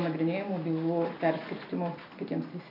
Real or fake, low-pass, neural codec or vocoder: real; 5.4 kHz; none